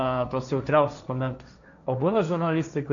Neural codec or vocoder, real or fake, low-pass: codec, 16 kHz, 1.1 kbps, Voila-Tokenizer; fake; 7.2 kHz